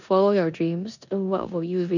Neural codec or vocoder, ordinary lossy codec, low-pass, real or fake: codec, 16 kHz in and 24 kHz out, 0.9 kbps, LongCat-Audio-Codec, four codebook decoder; none; 7.2 kHz; fake